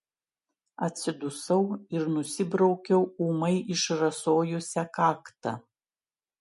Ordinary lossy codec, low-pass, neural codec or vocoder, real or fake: MP3, 48 kbps; 14.4 kHz; none; real